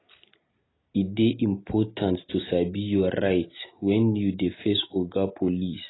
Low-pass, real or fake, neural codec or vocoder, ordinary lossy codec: 7.2 kHz; real; none; AAC, 16 kbps